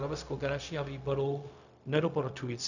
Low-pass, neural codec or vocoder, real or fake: 7.2 kHz; codec, 16 kHz, 0.4 kbps, LongCat-Audio-Codec; fake